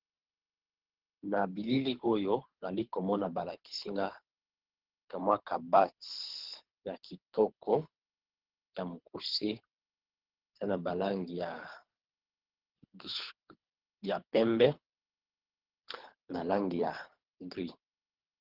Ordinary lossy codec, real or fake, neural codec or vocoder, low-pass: Opus, 16 kbps; fake; codec, 24 kHz, 3 kbps, HILCodec; 5.4 kHz